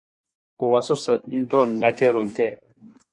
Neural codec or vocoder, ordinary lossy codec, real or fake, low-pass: codec, 24 kHz, 1 kbps, SNAC; Opus, 64 kbps; fake; 10.8 kHz